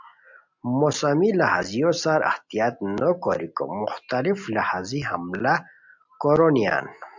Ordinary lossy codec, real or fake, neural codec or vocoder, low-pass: MP3, 64 kbps; real; none; 7.2 kHz